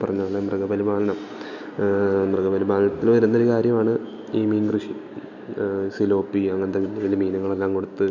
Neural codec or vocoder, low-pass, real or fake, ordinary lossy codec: none; 7.2 kHz; real; none